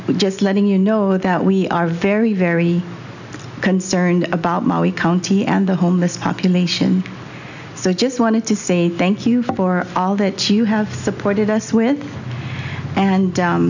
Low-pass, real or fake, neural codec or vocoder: 7.2 kHz; real; none